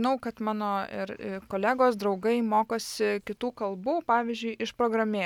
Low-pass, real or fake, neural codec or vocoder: 19.8 kHz; real; none